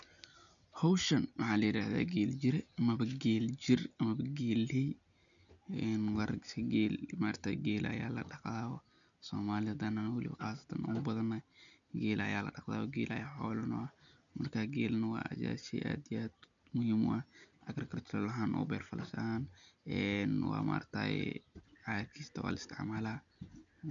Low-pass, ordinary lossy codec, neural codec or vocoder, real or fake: 7.2 kHz; none; none; real